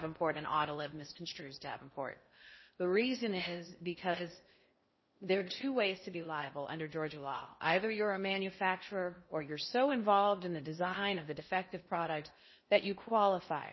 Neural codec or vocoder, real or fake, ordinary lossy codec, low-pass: codec, 16 kHz in and 24 kHz out, 0.6 kbps, FocalCodec, streaming, 4096 codes; fake; MP3, 24 kbps; 7.2 kHz